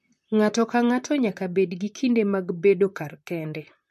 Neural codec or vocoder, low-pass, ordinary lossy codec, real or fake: vocoder, 44.1 kHz, 128 mel bands, Pupu-Vocoder; 14.4 kHz; MP3, 64 kbps; fake